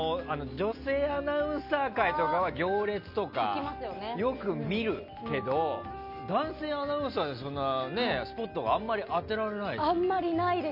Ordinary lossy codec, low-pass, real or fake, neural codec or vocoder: none; 5.4 kHz; real; none